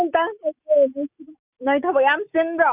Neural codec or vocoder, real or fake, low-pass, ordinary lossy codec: none; real; 3.6 kHz; none